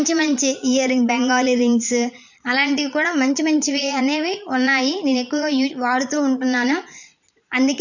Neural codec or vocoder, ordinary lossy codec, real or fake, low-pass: vocoder, 22.05 kHz, 80 mel bands, Vocos; none; fake; 7.2 kHz